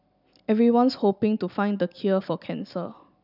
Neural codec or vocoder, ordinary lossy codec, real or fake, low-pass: vocoder, 44.1 kHz, 128 mel bands every 256 samples, BigVGAN v2; none; fake; 5.4 kHz